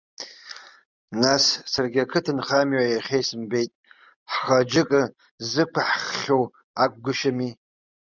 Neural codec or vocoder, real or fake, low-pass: none; real; 7.2 kHz